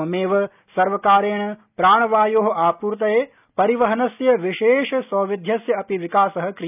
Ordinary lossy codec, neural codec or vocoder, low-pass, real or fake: none; none; 3.6 kHz; real